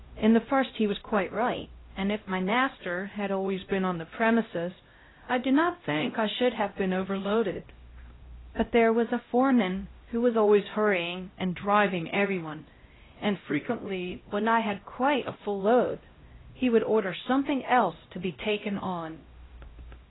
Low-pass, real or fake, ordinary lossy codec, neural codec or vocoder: 7.2 kHz; fake; AAC, 16 kbps; codec, 16 kHz, 0.5 kbps, X-Codec, HuBERT features, trained on LibriSpeech